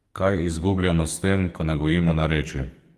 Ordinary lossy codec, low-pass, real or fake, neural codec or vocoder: Opus, 32 kbps; 14.4 kHz; fake; codec, 44.1 kHz, 2.6 kbps, SNAC